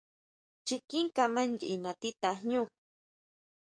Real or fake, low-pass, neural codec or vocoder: fake; 9.9 kHz; codec, 44.1 kHz, 7.8 kbps, DAC